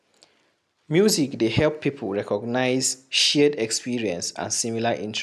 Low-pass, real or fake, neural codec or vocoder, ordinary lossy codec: 14.4 kHz; real; none; none